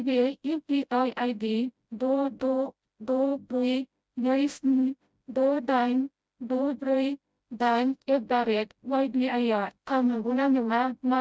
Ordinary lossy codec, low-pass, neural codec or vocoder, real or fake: none; none; codec, 16 kHz, 0.5 kbps, FreqCodec, smaller model; fake